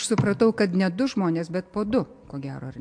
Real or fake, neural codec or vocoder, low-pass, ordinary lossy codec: real; none; 9.9 kHz; MP3, 64 kbps